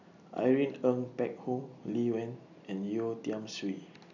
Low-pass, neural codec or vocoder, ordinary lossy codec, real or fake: 7.2 kHz; none; none; real